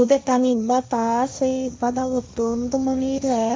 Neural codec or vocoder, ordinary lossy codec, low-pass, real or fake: codec, 16 kHz, 1.1 kbps, Voila-Tokenizer; none; none; fake